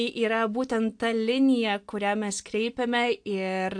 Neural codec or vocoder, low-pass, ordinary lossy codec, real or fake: none; 9.9 kHz; AAC, 64 kbps; real